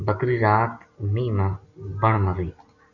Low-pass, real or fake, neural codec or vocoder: 7.2 kHz; real; none